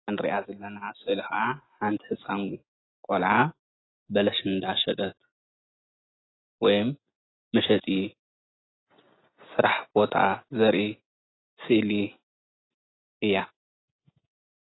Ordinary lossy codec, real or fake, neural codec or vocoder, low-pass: AAC, 16 kbps; real; none; 7.2 kHz